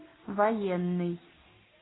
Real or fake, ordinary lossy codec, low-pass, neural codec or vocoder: real; AAC, 16 kbps; 7.2 kHz; none